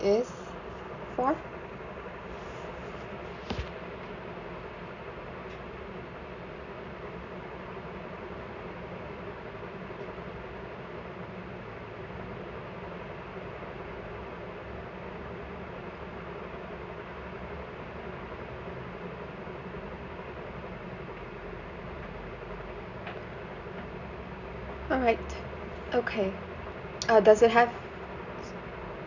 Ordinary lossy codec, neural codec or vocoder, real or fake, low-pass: none; none; real; 7.2 kHz